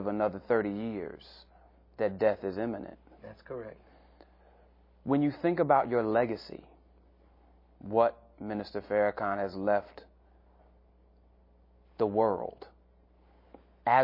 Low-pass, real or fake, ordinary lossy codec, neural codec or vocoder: 5.4 kHz; real; MP3, 24 kbps; none